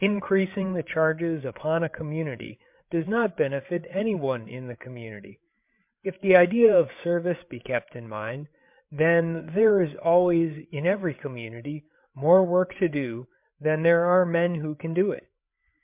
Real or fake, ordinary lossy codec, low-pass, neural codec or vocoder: fake; MP3, 32 kbps; 3.6 kHz; codec, 16 kHz, 8 kbps, FreqCodec, larger model